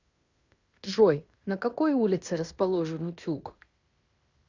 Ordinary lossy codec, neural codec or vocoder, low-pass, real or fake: Opus, 64 kbps; codec, 16 kHz in and 24 kHz out, 0.9 kbps, LongCat-Audio-Codec, fine tuned four codebook decoder; 7.2 kHz; fake